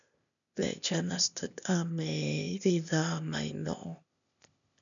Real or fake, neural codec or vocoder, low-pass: fake; codec, 16 kHz, 0.8 kbps, ZipCodec; 7.2 kHz